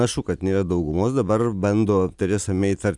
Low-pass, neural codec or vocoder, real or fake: 10.8 kHz; none; real